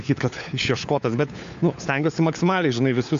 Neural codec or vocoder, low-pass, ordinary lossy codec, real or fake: codec, 16 kHz, 4 kbps, FunCodec, trained on LibriTTS, 50 frames a second; 7.2 kHz; AAC, 64 kbps; fake